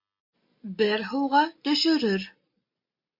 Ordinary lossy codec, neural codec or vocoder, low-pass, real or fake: MP3, 32 kbps; none; 5.4 kHz; real